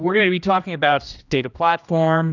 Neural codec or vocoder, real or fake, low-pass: codec, 16 kHz, 1 kbps, X-Codec, HuBERT features, trained on general audio; fake; 7.2 kHz